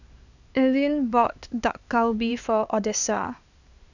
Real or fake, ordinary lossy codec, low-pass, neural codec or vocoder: fake; none; 7.2 kHz; codec, 16 kHz, 2 kbps, X-Codec, HuBERT features, trained on LibriSpeech